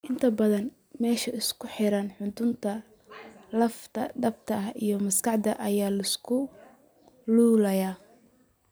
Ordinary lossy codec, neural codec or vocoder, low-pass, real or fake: none; none; none; real